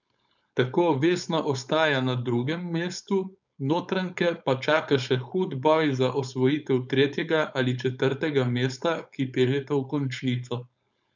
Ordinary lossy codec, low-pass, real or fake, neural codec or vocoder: none; 7.2 kHz; fake; codec, 16 kHz, 4.8 kbps, FACodec